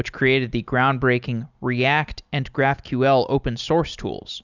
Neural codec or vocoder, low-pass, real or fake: none; 7.2 kHz; real